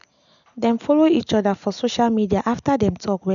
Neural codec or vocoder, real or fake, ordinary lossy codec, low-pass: none; real; none; 7.2 kHz